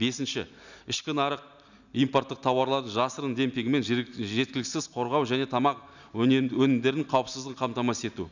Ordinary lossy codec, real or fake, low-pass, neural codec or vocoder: none; real; 7.2 kHz; none